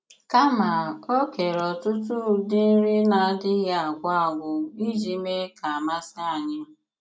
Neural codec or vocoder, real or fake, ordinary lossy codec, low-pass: none; real; none; none